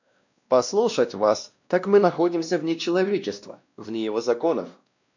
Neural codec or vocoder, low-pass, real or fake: codec, 16 kHz, 1 kbps, X-Codec, WavLM features, trained on Multilingual LibriSpeech; 7.2 kHz; fake